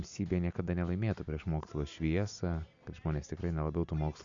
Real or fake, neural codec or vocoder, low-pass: real; none; 7.2 kHz